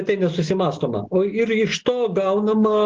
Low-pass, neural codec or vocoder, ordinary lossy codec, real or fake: 7.2 kHz; none; Opus, 16 kbps; real